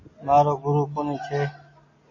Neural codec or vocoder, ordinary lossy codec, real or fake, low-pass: none; MP3, 32 kbps; real; 7.2 kHz